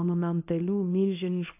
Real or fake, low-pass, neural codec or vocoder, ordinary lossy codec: fake; 3.6 kHz; codec, 24 kHz, 0.9 kbps, WavTokenizer, medium speech release version 1; AAC, 24 kbps